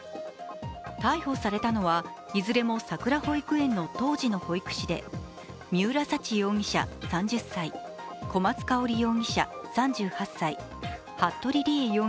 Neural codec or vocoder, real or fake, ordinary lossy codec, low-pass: none; real; none; none